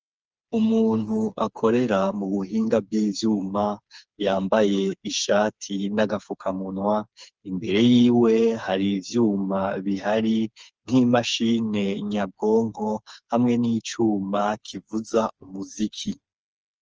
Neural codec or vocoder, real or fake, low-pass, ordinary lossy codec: codec, 16 kHz, 4 kbps, FreqCodec, smaller model; fake; 7.2 kHz; Opus, 24 kbps